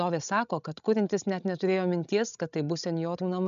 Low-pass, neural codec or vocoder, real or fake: 7.2 kHz; codec, 16 kHz, 16 kbps, FreqCodec, larger model; fake